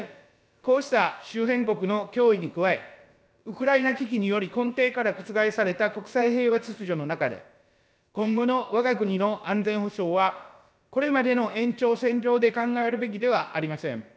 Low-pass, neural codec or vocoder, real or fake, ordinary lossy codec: none; codec, 16 kHz, about 1 kbps, DyCAST, with the encoder's durations; fake; none